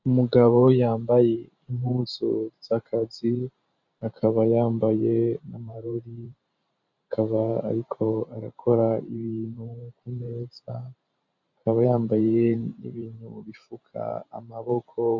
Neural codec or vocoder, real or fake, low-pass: vocoder, 44.1 kHz, 128 mel bands every 512 samples, BigVGAN v2; fake; 7.2 kHz